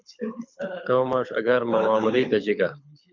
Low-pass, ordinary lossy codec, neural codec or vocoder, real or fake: 7.2 kHz; MP3, 64 kbps; codec, 24 kHz, 6 kbps, HILCodec; fake